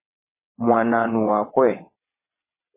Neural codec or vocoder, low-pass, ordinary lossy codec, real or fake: vocoder, 22.05 kHz, 80 mel bands, WaveNeXt; 3.6 kHz; MP3, 16 kbps; fake